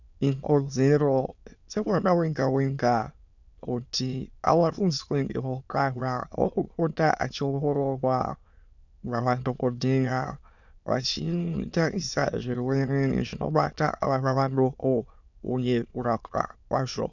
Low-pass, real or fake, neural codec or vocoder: 7.2 kHz; fake; autoencoder, 22.05 kHz, a latent of 192 numbers a frame, VITS, trained on many speakers